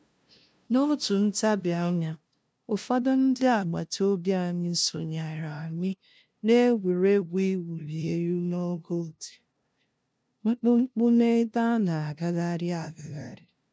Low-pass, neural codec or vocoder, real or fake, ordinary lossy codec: none; codec, 16 kHz, 0.5 kbps, FunCodec, trained on LibriTTS, 25 frames a second; fake; none